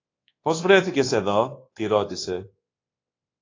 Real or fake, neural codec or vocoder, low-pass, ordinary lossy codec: fake; codec, 24 kHz, 1.2 kbps, DualCodec; 7.2 kHz; AAC, 32 kbps